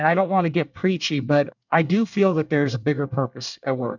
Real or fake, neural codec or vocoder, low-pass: fake; codec, 24 kHz, 1 kbps, SNAC; 7.2 kHz